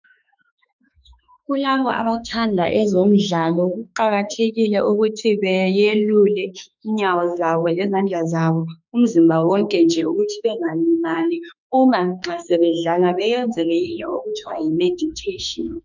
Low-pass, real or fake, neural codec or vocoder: 7.2 kHz; fake; autoencoder, 48 kHz, 32 numbers a frame, DAC-VAE, trained on Japanese speech